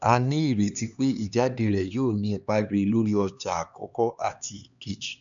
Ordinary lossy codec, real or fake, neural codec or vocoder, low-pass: none; fake; codec, 16 kHz, 2 kbps, X-Codec, HuBERT features, trained on LibriSpeech; 7.2 kHz